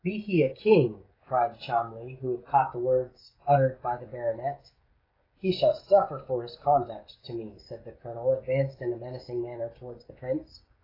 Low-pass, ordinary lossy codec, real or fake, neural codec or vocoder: 5.4 kHz; AAC, 24 kbps; fake; codec, 16 kHz, 16 kbps, FreqCodec, smaller model